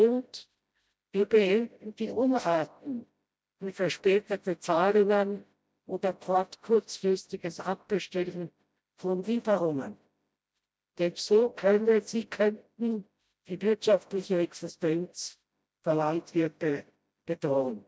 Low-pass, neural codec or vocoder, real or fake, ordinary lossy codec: none; codec, 16 kHz, 0.5 kbps, FreqCodec, smaller model; fake; none